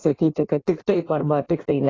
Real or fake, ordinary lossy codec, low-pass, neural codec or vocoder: fake; AAC, 32 kbps; 7.2 kHz; codec, 16 kHz in and 24 kHz out, 1.1 kbps, FireRedTTS-2 codec